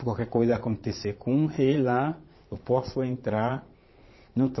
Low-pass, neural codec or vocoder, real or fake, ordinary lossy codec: 7.2 kHz; vocoder, 22.05 kHz, 80 mel bands, Vocos; fake; MP3, 24 kbps